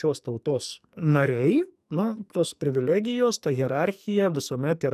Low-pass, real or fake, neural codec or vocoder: 14.4 kHz; fake; codec, 44.1 kHz, 2.6 kbps, SNAC